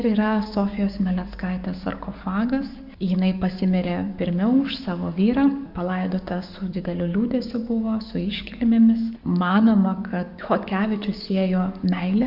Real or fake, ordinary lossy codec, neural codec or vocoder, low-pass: fake; Opus, 64 kbps; codec, 44.1 kHz, 7.8 kbps, DAC; 5.4 kHz